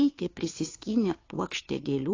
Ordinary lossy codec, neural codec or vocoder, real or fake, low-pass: AAC, 32 kbps; codec, 16 kHz, 2 kbps, FunCodec, trained on Chinese and English, 25 frames a second; fake; 7.2 kHz